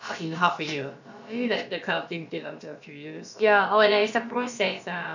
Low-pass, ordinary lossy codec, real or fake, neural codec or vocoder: 7.2 kHz; none; fake; codec, 16 kHz, about 1 kbps, DyCAST, with the encoder's durations